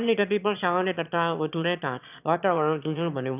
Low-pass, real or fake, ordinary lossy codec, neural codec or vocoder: 3.6 kHz; fake; none; autoencoder, 22.05 kHz, a latent of 192 numbers a frame, VITS, trained on one speaker